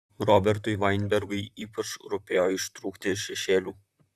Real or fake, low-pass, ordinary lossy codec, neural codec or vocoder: fake; 14.4 kHz; Opus, 64 kbps; vocoder, 44.1 kHz, 128 mel bands, Pupu-Vocoder